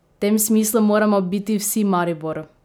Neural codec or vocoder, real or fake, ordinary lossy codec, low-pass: none; real; none; none